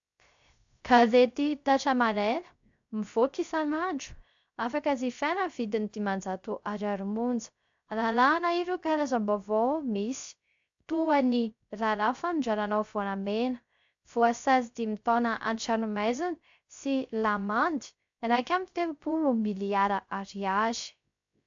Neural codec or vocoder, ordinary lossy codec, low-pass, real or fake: codec, 16 kHz, 0.3 kbps, FocalCodec; AAC, 64 kbps; 7.2 kHz; fake